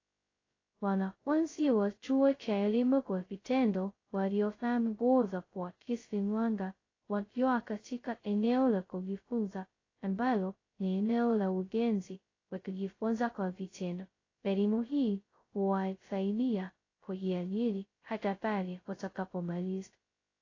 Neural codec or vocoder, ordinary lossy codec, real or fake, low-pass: codec, 16 kHz, 0.2 kbps, FocalCodec; AAC, 32 kbps; fake; 7.2 kHz